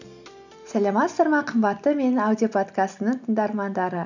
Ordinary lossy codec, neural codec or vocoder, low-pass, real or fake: none; none; 7.2 kHz; real